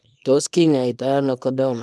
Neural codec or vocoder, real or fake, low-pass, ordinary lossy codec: codec, 24 kHz, 0.9 kbps, WavTokenizer, small release; fake; none; none